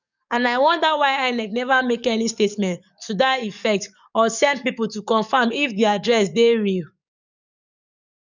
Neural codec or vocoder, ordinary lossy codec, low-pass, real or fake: codec, 44.1 kHz, 7.8 kbps, DAC; none; 7.2 kHz; fake